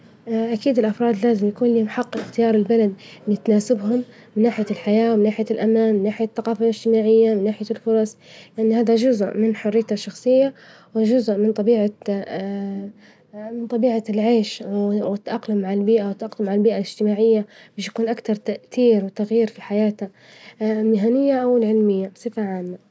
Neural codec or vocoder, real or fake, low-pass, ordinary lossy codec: none; real; none; none